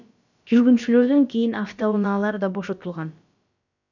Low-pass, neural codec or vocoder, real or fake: 7.2 kHz; codec, 16 kHz, about 1 kbps, DyCAST, with the encoder's durations; fake